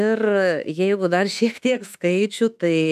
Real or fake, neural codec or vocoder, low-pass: fake; autoencoder, 48 kHz, 32 numbers a frame, DAC-VAE, trained on Japanese speech; 14.4 kHz